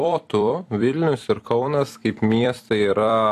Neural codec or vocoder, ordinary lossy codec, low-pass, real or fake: vocoder, 44.1 kHz, 128 mel bands every 256 samples, BigVGAN v2; AAC, 64 kbps; 14.4 kHz; fake